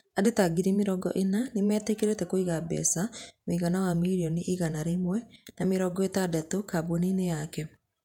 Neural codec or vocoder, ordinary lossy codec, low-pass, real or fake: vocoder, 48 kHz, 128 mel bands, Vocos; none; 19.8 kHz; fake